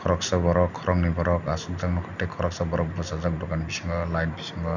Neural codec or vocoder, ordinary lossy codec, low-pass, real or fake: none; none; 7.2 kHz; real